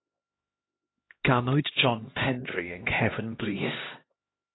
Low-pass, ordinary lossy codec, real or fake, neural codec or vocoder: 7.2 kHz; AAC, 16 kbps; fake; codec, 16 kHz, 1 kbps, X-Codec, HuBERT features, trained on LibriSpeech